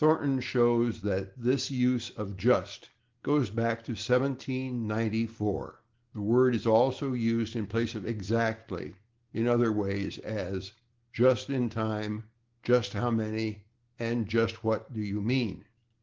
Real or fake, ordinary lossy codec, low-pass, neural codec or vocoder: real; Opus, 16 kbps; 7.2 kHz; none